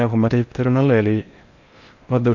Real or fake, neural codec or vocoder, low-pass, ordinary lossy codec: fake; codec, 16 kHz in and 24 kHz out, 0.6 kbps, FocalCodec, streaming, 4096 codes; 7.2 kHz; none